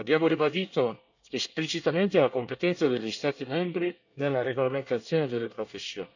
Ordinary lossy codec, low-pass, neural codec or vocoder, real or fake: none; 7.2 kHz; codec, 24 kHz, 1 kbps, SNAC; fake